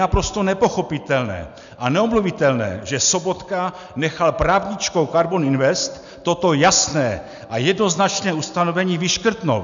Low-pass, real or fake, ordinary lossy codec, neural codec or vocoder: 7.2 kHz; real; MP3, 96 kbps; none